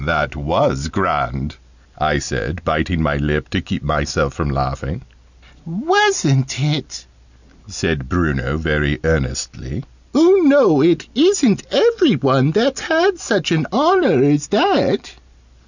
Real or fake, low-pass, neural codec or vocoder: real; 7.2 kHz; none